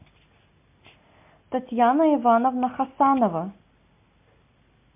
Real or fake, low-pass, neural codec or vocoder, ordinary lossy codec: real; 3.6 kHz; none; MP3, 32 kbps